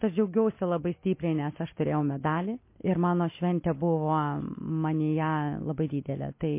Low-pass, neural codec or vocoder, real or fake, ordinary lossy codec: 3.6 kHz; none; real; MP3, 24 kbps